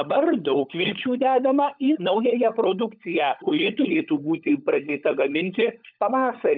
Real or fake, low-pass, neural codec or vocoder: fake; 5.4 kHz; codec, 16 kHz, 16 kbps, FunCodec, trained on LibriTTS, 50 frames a second